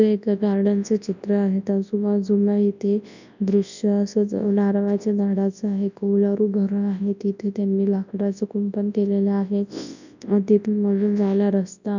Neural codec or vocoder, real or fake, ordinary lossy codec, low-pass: codec, 24 kHz, 0.9 kbps, WavTokenizer, large speech release; fake; none; 7.2 kHz